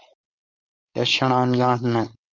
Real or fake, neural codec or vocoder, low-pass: fake; codec, 16 kHz, 4.8 kbps, FACodec; 7.2 kHz